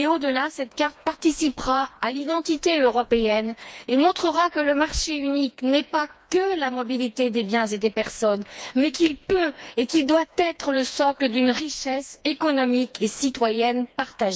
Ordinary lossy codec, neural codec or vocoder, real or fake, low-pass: none; codec, 16 kHz, 2 kbps, FreqCodec, smaller model; fake; none